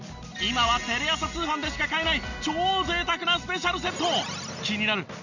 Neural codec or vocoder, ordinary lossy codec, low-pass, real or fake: none; none; 7.2 kHz; real